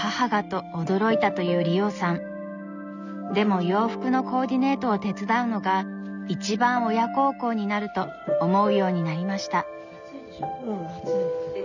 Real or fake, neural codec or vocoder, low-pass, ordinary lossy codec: real; none; 7.2 kHz; none